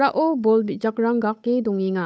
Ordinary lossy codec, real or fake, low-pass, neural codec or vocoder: none; fake; none; codec, 16 kHz, 8 kbps, FunCodec, trained on Chinese and English, 25 frames a second